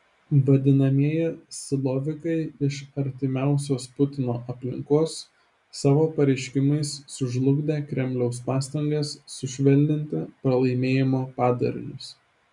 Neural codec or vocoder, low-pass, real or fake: none; 10.8 kHz; real